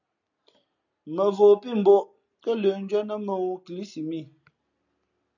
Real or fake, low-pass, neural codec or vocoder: real; 7.2 kHz; none